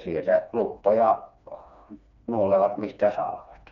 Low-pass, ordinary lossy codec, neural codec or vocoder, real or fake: 7.2 kHz; none; codec, 16 kHz, 2 kbps, FreqCodec, smaller model; fake